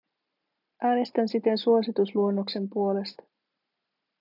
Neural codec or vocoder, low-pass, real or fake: none; 5.4 kHz; real